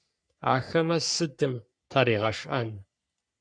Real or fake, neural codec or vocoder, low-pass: fake; codec, 44.1 kHz, 3.4 kbps, Pupu-Codec; 9.9 kHz